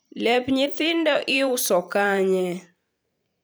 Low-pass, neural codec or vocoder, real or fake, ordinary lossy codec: none; vocoder, 44.1 kHz, 128 mel bands every 512 samples, BigVGAN v2; fake; none